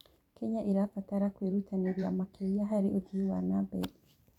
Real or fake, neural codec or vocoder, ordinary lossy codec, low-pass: fake; vocoder, 44.1 kHz, 128 mel bands every 512 samples, BigVGAN v2; none; 19.8 kHz